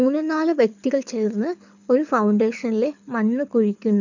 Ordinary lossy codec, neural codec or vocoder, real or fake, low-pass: none; codec, 24 kHz, 6 kbps, HILCodec; fake; 7.2 kHz